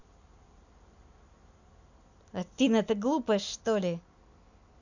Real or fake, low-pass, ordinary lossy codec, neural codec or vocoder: real; 7.2 kHz; none; none